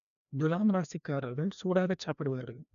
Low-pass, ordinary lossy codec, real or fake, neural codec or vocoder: 7.2 kHz; none; fake; codec, 16 kHz, 1 kbps, FreqCodec, larger model